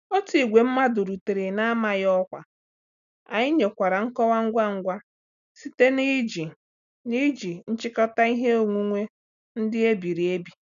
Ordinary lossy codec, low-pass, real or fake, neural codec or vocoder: none; 7.2 kHz; real; none